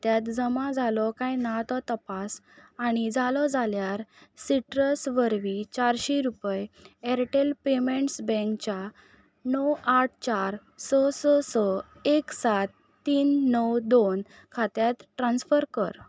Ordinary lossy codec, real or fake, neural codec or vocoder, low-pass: none; real; none; none